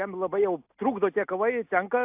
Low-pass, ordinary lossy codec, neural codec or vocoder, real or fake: 3.6 kHz; AAC, 32 kbps; none; real